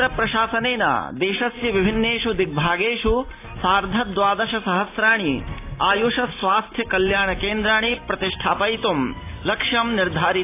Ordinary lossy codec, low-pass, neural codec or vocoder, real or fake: AAC, 24 kbps; 3.6 kHz; none; real